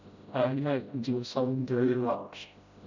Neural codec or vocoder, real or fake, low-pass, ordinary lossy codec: codec, 16 kHz, 0.5 kbps, FreqCodec, smaller model; fake; 7.2 kHz; none